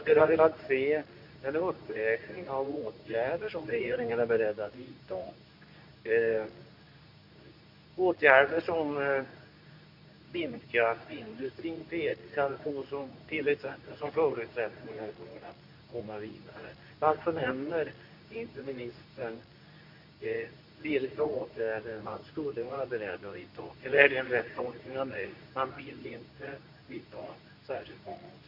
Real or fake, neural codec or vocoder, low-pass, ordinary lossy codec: fake; codec, 24 kHz, 0.9 kbps, WavTokenizer, medium speech release version 2; 5.4 kHz; none